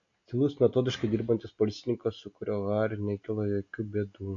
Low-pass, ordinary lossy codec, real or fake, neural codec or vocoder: 7.2 kHz; AAC, 48 kbps; real; none